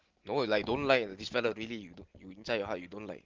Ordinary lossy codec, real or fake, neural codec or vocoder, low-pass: Opus, 16 kbps; real; none; 7.2 kHz